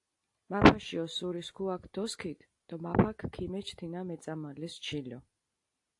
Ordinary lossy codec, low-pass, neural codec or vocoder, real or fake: AAC, 48 kbps; 10.8 kHz; none; real